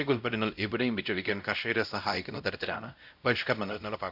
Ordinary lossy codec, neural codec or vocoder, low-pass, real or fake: none; codec, 16 kHz, 0.5 kbps, X-Codec, WavLM features, trained on Multilingual LibriSpeech; 5.4 kHz; fake